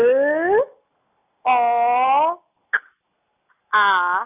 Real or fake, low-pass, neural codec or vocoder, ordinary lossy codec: real; 3.6 kHz; none; none